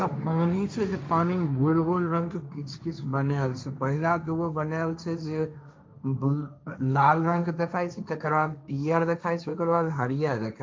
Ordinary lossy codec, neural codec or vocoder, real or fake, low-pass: none; codec, 16 kHz, 1.1 kbps, Voila-Tokenizer; fake; none